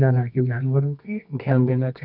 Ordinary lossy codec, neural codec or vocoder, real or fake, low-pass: none; codec, 24 kHz, 0.9 kbps, WavTokenizer, medium music audio release; fake; 5.4 kHz